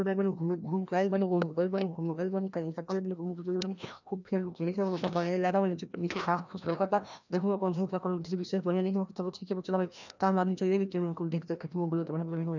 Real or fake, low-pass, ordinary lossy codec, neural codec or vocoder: fake; 7.2 kHz; none; codec, 16 kHz, 1 kbps, FreqCodec, larger model